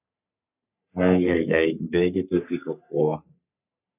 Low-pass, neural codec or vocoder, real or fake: 3.6 kHz; codec, 44.1 kHz, 3.4 kbps, Pupu-Codec; fake